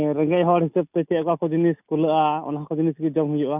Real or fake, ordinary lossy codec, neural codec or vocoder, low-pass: real; none; none; 3.6 kHz